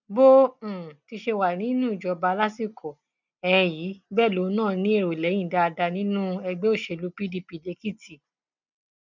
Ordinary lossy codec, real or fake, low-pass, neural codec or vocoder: none; real; 7.2 kHz; none